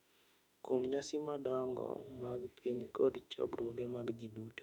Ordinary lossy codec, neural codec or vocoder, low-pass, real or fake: none; autoencoder, 48 kHz, 32 numbers a frame, DAC-VAE, trained on Japanese speech; 19.8 kHz; fake